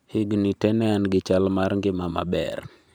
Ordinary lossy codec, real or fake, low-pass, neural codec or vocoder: none; fake; none; vocoder, 44.1 kHz, 128 mel bands every 512 samples, BigVGAN v2